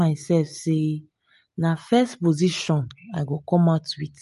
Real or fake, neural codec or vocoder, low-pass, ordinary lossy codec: real; none; 14.4 kHz; MP3, 48 kbps